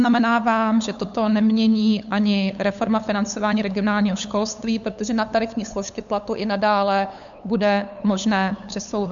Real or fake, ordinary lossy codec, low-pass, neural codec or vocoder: fake; MP3, 64 kbps; 7.2 kHz; codec, 16 kHz, 8 kbps, FunCodec, trained on LibriTTS, 25 frames a second